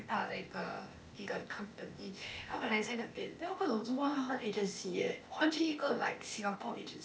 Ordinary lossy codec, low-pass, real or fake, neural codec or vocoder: none; none; fake; codec, 16 kHz, 0.8 kbps, ZipCodec